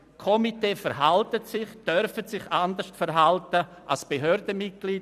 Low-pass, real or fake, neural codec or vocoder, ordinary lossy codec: 14.4 kHz; fake; vocoder, 44.1 kHz, 128 mel bands every 256 samples, BigVGAN v2; none